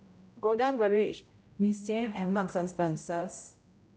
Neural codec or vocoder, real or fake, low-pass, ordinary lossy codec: codec, 16 kHz, 0.5 kbps, X-Codec, HuBERT features, trained on general audio; fake; none; none